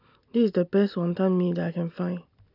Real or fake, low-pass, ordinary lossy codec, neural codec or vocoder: real; 5.4 kHz; AAC, 48 kbps; none